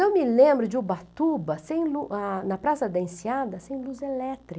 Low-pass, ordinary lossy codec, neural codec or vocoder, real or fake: none; none; none; real